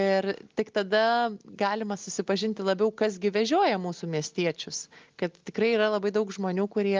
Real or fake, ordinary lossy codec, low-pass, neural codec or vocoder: real; Opus, 24 kbps; 7.2 kHz; none